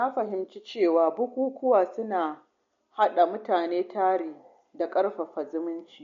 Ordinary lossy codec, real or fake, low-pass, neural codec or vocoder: MP3, 48 kbps; real; 7.2 kHz; none